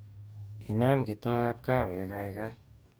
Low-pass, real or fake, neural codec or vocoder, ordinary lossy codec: none; fake; codec, 44.1 kHz, 2.6 kbps, DAC; none